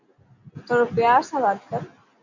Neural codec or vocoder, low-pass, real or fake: none; 7.2 kHz; real